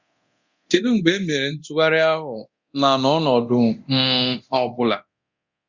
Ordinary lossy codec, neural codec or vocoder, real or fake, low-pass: Opus, 64 kbps; codec, 24 kHz, 0.9 kbps, DualCodec; fake; 7.2 kHz